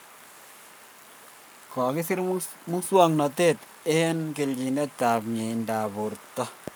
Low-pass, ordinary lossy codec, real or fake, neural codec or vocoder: none; none; fake; codec, 44.1 kHz, 7.8 kbps, Pupu-Codec